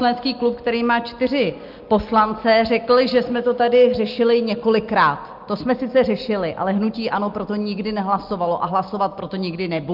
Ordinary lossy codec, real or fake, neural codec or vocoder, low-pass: Opus, 32 kbps; real; none; 5.4 kHz